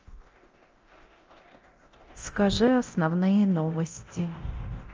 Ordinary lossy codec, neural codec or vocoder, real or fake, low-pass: Opus, 24 kbps; codec, 24 kHz, 0.9 kbps, DualCodec; fake; 7.2 kHz